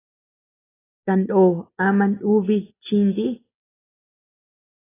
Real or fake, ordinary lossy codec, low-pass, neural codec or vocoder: real; AAC, 16 kbps; 3.6 kHz; none